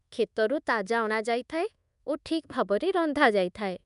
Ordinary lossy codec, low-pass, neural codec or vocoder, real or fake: none; 10.8 kHz; codec, 24 kHz, 1.2 kbps, DualCodec; fake